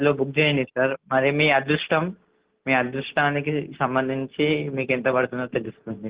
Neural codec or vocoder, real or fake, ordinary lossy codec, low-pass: none; real; Opus, 16 kbps; 3.6 kHz